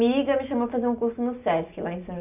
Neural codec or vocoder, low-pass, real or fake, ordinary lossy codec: none; 3.6 kHz; real; AAC, 32 kbps